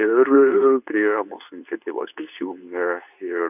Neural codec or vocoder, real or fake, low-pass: codec, 24 kHz, 0.9 kbps, WavTokenizer, medium speech release version 2; fake; 3.6 kHz